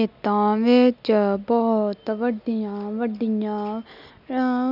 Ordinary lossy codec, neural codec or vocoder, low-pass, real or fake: none; none; 5.4 kHz; real